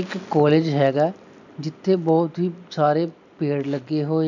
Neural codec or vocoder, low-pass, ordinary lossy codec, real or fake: none; 7.2 kHz; none; real